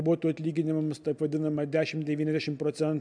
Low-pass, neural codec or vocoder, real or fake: 9.9 kHz; none; real